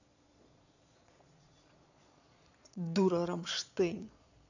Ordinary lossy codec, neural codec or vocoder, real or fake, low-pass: none; vocoder, 22.05 kHz, 80 mel bands, Vocos; fake; 7.2 kHz